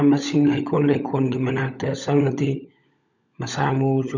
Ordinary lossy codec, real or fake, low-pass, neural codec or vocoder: none; fake; 7.2 kHz; codec, 16 kHz, 16 kbps, FunCodec, trained on LibriTTS, 50 frames a second